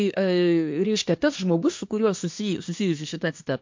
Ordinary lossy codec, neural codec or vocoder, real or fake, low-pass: MP3, 48 kbps; codec, 24 kHz, 1 kbps, SNAC; fake; 7.2 kHz